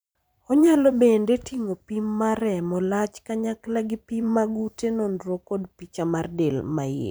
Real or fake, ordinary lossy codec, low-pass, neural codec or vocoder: fake; none; none; vocoder, 44.1 kHz, 128 mel bands every 512 samples, BigVGAN v2